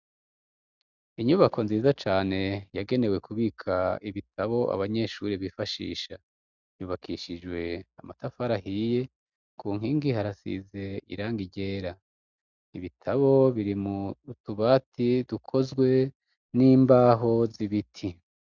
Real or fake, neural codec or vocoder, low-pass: real; none; 7.2 kHz